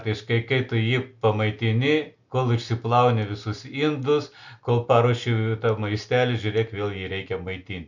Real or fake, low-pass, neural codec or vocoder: real; 7.2 kHz; none